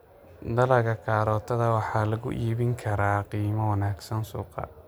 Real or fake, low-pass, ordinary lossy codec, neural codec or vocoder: real; none; none; none